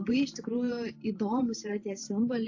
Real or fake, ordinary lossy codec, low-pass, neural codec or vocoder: fake; AAC, 48 kbps; 7.2 kHz; vocoder, 44.1 kHz, 128 mel bands every 512 samples, BigVGAN v2